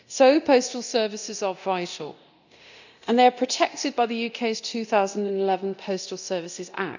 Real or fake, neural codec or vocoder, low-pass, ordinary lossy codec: fake; codec, 24 kHz, 0.9 kbps, DualCodec; 7.2 kHz; none